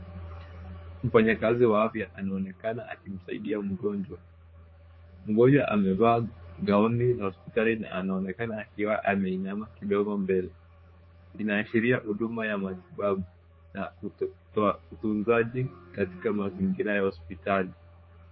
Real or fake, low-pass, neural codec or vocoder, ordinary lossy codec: fake; 7.2 kHz; codec, 16 kHz, 4 kbps, X-Codec, HuBERT features, trained on general audio; MP3, 24 kbps